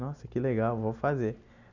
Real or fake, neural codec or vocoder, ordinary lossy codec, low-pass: real; none; none; 7.2 kHz